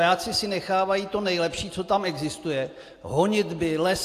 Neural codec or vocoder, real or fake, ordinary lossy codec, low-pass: none; real; AAC, 64 kbps; 14.4 kHz